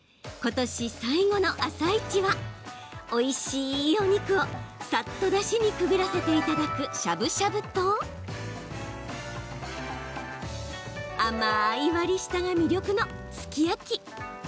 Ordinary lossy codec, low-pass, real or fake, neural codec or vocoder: none; none; real; none